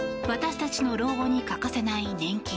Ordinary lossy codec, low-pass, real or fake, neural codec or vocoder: none; none; real; none